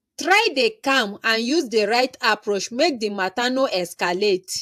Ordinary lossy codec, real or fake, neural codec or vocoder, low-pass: AAC, 96 kbps; fake; vocoder, 48 kHz, 128 mel bands, Vocos; 14.4 kHz